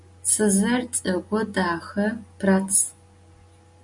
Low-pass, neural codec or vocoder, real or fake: 10.8 kHz; none; real